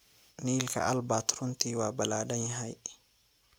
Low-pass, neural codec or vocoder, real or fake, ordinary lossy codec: none; none; real; none